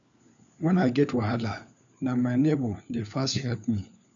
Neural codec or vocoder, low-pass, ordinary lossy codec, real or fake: codec, 16 kHz, 4 kbps, FunCodec, trained on LibriTTS, 50 frames a second; 7.2 kHz; none; fake